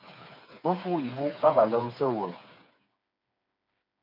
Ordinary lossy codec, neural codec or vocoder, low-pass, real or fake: MP3, 48 kbps; codec, 16 kHz, 4 kbps, FreqCodec, smaller model; 5.4 kHz; fake